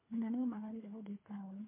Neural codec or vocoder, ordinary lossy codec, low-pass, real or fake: codec, 24 kHz, 3 kbps, HILCodec; MP3, 24 kbps; 3.6 kHz; fake